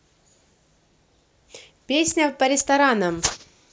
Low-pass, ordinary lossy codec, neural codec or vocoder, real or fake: none; none; none; real